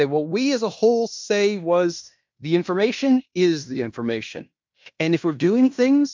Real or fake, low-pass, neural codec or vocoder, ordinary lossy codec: fake; 7.2 kHz; codec, 16 kHz in and 24 kHz out, 0.9 kbps, LongCat-Audio-Codec, fine tuned four codebook decoder; MP3, 64 kbps